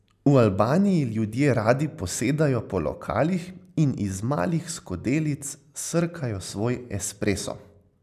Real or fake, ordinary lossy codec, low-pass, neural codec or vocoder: real; none; 14.4 kHz; none